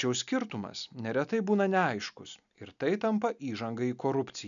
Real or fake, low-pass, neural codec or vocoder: real; 7.2 kHz; none